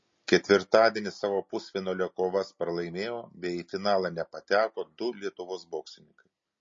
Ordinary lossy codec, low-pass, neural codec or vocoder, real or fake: MP3, 32 kbps; 7.2 kHz; none; real